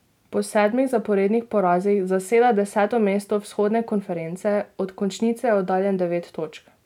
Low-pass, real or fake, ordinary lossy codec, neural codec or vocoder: 19.8 kHz; real; none; none